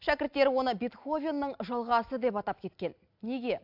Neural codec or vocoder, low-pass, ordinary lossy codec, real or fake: none; 5.4 kHz; AAC, 48 kbps; real